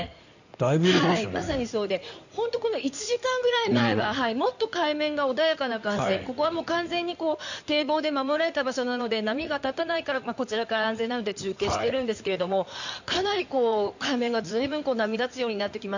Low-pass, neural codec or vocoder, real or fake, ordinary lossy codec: 7.2 kHz; codec, 16 kHz in and 24 kHz out, 2.2 kbps, FireRedTTS-2 codec; fake; none